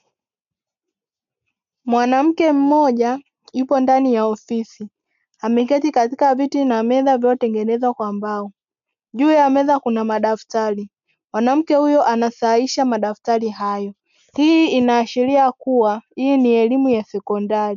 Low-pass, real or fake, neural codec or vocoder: 7.2 kHz; real; none